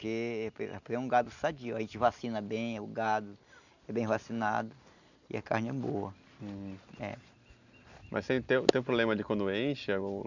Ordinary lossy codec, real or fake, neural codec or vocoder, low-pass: none; real; none; 7.2 kHz